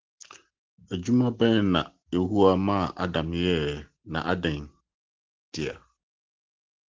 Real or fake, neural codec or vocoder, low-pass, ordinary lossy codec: real; none; 7.2 kHz; Opus, 16 kbps